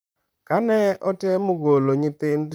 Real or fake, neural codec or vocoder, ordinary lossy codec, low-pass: fake; vocoder, 44.1 kHz, 128 mel bands, Pupu-Vocoder; none; none